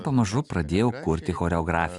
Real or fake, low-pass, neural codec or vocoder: real; 10.8 kHz; none